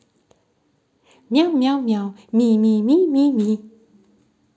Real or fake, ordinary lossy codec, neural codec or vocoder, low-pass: real; none; none; none